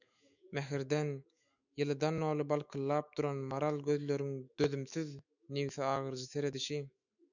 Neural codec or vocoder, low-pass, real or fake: autoencoder, 48 kHz, 128 numbers a frame, DAC-VAE, trained on Japanese speech; 7.2 kHz; fake